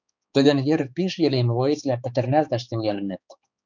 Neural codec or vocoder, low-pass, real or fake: codec, 16 kHz, 4 kbps, X-Codec, HuBERT features, trained on balanced general audio; 7.2 kHz; fake